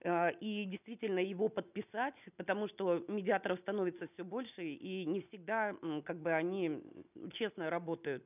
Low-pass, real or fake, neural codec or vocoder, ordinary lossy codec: 3.6 kHz; real; none; none